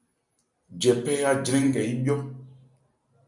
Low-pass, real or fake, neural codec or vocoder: 10.8 kHz; real; none